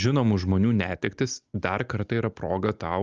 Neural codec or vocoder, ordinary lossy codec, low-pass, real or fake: none; Opus, 24 kbps; 7.2 kHz; real